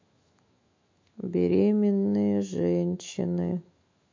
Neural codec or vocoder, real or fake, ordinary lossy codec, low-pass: autoencoder, 48 kHz, 128 numbers a frame, DAC-VAE, trained on Japanese speech; fake; MP3, 48 kbps; 7.2 kHz